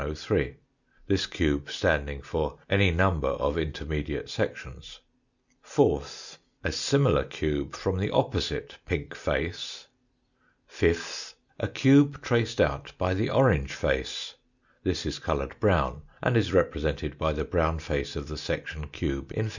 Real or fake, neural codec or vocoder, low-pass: real; none; 7.2 kHz